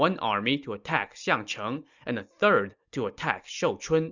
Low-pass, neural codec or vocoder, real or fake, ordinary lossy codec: 7.2 kHz; none; real; Opus, 64 kbps